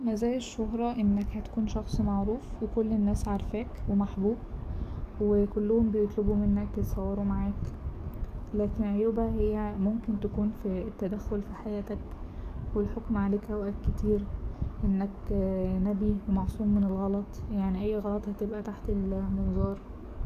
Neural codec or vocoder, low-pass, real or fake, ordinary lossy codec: codec, 44.1 kHz, 7.8 kbps, DAC; 14.4 kHz; fake; none